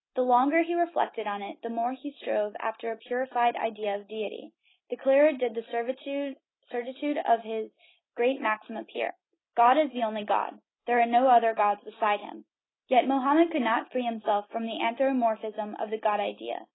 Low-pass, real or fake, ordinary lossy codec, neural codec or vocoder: 7.2 kHz; real; AAC, 16 kbps; none